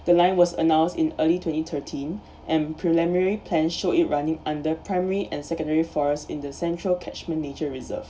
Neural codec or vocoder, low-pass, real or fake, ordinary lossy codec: none; none; real; none